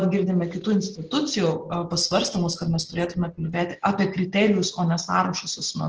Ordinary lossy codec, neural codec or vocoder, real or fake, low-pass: Opus, 16 kbps; none; real; 7.2 kHz